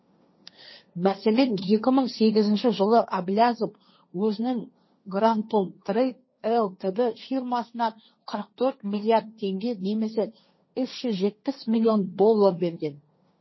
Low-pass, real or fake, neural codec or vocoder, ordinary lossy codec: 7.2 kHz; fake; codec, 16 kHz, 1.1 kbps, Voila-Tokenizer; MP3, 24 kbps